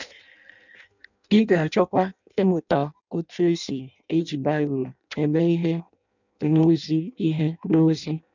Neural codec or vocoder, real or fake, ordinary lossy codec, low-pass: codec, 16 kHz in and 24 kHz out, 0.6 kbps, FireRedTTS-2 codec; fake; none; 7.2 kHz